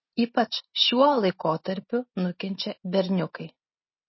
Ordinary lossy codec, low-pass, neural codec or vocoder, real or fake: MP3, 24 kbps; 7.2 kHz; none; real